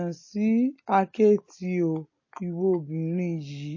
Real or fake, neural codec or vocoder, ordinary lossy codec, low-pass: real; none; MP3, 32 kbps; 7.2 kHz